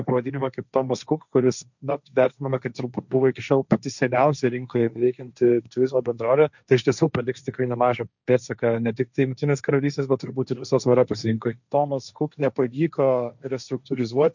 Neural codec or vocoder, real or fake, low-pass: codec, 16 kHz, 1.1 kbps, Voila-Tokenizer; fake; 7.2 kHz